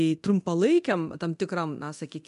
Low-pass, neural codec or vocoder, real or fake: 10.8 kHz; codec, 24 kHz, 0.9 kbps, DualCodec; fake